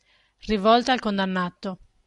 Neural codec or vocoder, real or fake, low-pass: none; real; 10.8 kHz